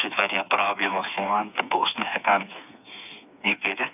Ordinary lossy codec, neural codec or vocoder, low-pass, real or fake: none; codec, 16 kHz, 4 kbps, FreqCodec, smaller model; 3.6 kHz; fake